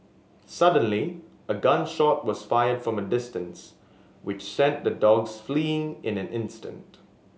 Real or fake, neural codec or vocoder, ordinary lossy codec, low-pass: real; none; none; none